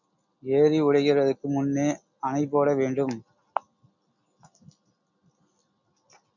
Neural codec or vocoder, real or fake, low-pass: none; real; 7.2 kHz